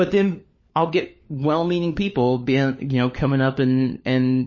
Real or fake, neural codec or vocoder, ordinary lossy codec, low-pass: fake; codec, 16 kHz, 4 kbps, FunCodec, trained on LibriTTS, 50 frames a second; MP3, 32 kbps; 7.2 kHz